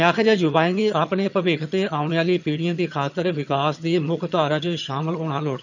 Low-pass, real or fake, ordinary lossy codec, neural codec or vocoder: 7.2 kHz; fake; none; vocoder, 22.05 kHz, 80 mel bands, HiFi-GAN